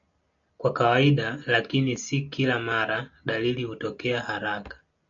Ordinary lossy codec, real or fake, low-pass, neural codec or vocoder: MP3, 64 kbps; real; 7.2 kHz; none